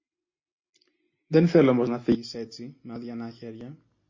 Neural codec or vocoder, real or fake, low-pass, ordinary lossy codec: none; real; 7.2 kHz; MP3, 32 kbps